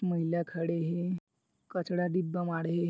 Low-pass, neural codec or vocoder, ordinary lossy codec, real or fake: none; none; none; real